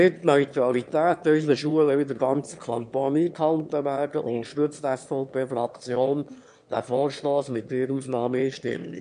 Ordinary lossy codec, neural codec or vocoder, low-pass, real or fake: MP3, 64 kbps; autoencoder, 22.05 kHz, a latent of 192 numbers a frame, VITS, trained on one speaker; 9.9 kHz; fake